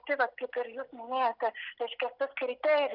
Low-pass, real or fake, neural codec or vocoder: 5.4 kHz; real; none